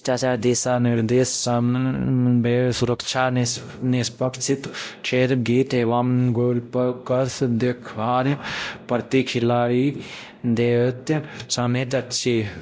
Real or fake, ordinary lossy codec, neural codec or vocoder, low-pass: fake; none; codec, 16 kHz, 0.5 kbps, X-Codec, WavLM features, trained on Multilingual LibriSpeech; none